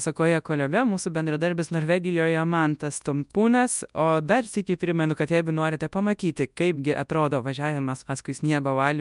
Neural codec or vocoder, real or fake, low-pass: codec, 24 kHz, 0.9 kbps, WavTokenizer, large speech release; fake; 10.8 kHz